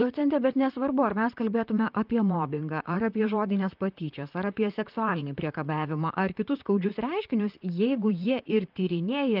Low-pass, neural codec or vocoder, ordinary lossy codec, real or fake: 5.4 kHz; vocoder, 44.1 kHz, 128 mel bands, Pupu-Vocoder; Opus, 24 kbps; fake